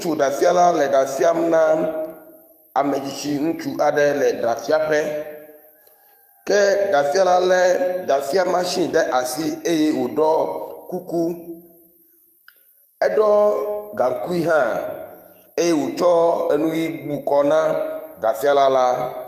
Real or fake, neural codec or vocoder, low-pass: fake; codec, 44.1 kHz, 7.8 kbps, DAC; 14.4 kHz